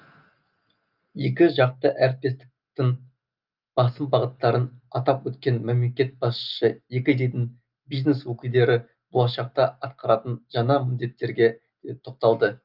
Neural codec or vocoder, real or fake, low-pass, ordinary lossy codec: none; real; 5.4 kHz; Opus, 24 kbps